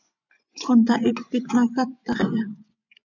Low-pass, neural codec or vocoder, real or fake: 7.2 kHz; vocoder, 24 kHz, 100 mel bands, Vocos; fake